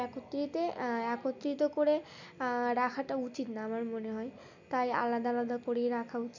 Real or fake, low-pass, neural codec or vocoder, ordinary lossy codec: real; 7.2 kHz; none; none